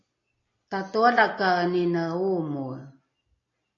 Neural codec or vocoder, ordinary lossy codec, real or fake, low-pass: none; AAC, 32 kbps; real; 7.2 kHz